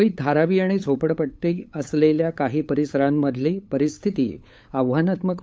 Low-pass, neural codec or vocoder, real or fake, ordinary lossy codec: none; codec, 16 kHz, 8 kbps, FunCodec, trained on LibriTTS, 25 frames a second; fake; none